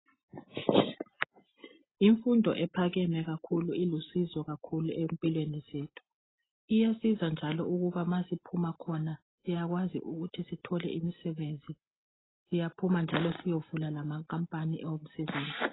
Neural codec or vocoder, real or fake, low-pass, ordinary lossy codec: none; real; 7.2 kHz; AAC, 16 kbps